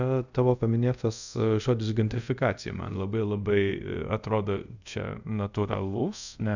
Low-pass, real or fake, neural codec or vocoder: 7.2 kHz; fake; codec, 24 kHz, 0.5 kbps, DualCodec